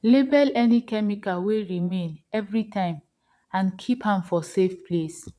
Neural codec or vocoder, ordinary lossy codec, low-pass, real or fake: vocoder, 22.05 kHz, 80 mel bands, Vocos; none; none; fake